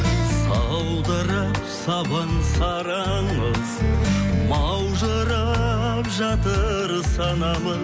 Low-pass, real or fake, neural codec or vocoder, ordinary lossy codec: none; real; none; none